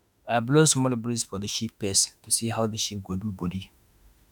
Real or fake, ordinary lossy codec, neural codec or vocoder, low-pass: fake; none; autoencoder, 48 kHz, 32 numbers a frame, DAC-VAE, trained on Japanese speech; none